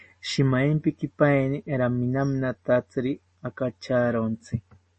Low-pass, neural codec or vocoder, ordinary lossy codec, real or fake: 10.8 kHz; none; MP3, 32 kbps; real